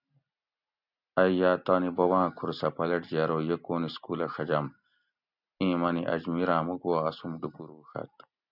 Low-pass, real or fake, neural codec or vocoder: 5.4 kHz; real; none